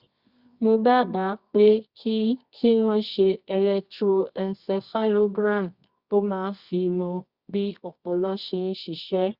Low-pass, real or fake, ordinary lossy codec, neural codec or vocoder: 5.4 kHz; fake; Opus, 64 kbps; codec, 24 kHz, 0.9 kbps, WavTokenizer, medium music audio release